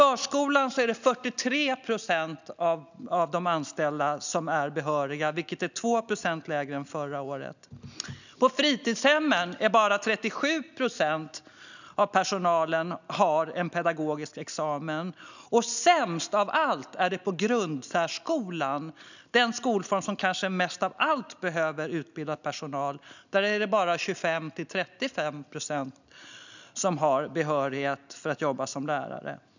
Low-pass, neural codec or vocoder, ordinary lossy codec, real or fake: 7.2 kHz; none; none; real